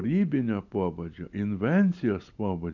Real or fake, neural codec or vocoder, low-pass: real; none; 7.2 kHz